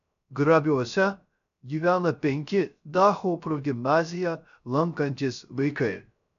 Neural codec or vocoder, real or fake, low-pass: codec, 16 kHz, 0.3 kbps, FocalCodec; fake; 7.2 kHz